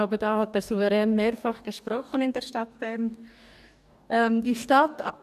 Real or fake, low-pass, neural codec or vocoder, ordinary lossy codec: fake; 14.4 kHz; codec, 44.1 kHz, 2.6 kbps, DAC; none